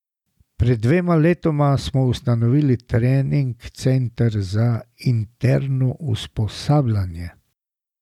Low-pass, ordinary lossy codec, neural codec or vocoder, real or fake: 19.8 kHz; none; none; real